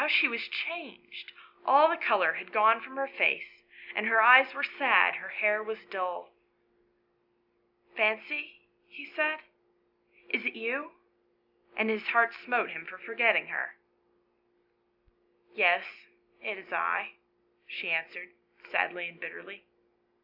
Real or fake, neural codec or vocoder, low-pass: real; none; 5.4 kHz